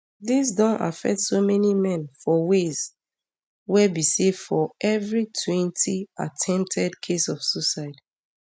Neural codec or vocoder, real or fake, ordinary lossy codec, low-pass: none; real; none; none